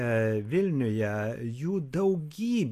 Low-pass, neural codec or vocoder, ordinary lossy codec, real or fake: 14.4 kHz; none; AAC, 64 kbps; real